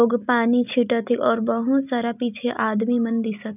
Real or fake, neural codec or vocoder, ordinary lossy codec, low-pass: real; none; none; 3.6 kHz